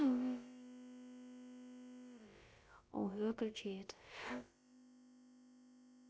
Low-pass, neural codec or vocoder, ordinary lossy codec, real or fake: none; codec, 16 kHz, about 1 kbps, DyCAST, with the encoder's durations; none; fake